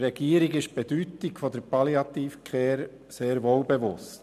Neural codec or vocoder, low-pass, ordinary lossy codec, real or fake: none; 14.4 kHz; none; real